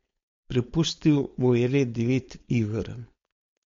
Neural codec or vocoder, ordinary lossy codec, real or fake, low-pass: codec, 16 kHz, 4.8 kbps, FACodec; MP3, 48 kbps; fake; 7.2 kHz